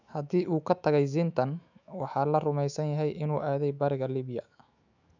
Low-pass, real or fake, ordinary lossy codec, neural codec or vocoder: 7.2 kHz; fake; none; autoencoder, 48 kHz, 128 numbers a frame, DAC-VAE, trained on Japanese speech